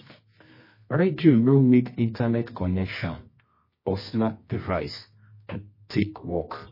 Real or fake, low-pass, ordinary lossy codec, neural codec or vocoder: fake; 5.4 kHz; MP3, 24 kbps; codec, 24 kHz, 0.9 kbps, WavTokenizer, medium music audio release